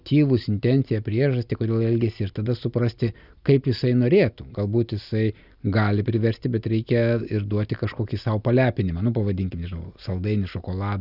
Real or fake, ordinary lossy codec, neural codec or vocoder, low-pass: real; Opus, 64 kbps; none; 5.4 kHz